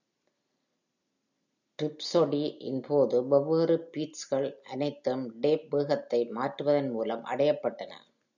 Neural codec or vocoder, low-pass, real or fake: none; 7.2 kHz; real